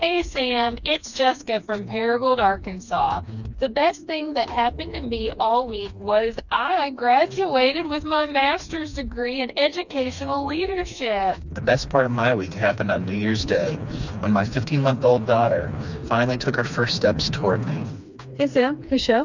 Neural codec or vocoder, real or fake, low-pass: codec, 16 kHz, 2 kbps, FreqCodec, smaller model; fake; 7.2 kHz